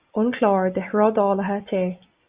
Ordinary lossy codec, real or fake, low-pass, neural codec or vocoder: Opus, 64 kbps; real; 3.6 kHz; none